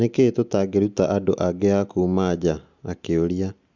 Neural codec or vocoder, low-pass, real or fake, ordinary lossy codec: none; 7.2 kHz; real; Opus, 64 kbps